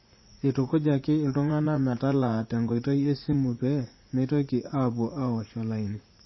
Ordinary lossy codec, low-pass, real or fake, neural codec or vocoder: MP3, 24 kbps; 7.2 kHz; fake; vocoder, 44.1 kHz, 80 mel bands, Vocos